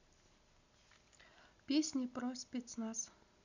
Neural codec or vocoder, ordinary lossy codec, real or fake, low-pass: none; none; real; 7.2 kHz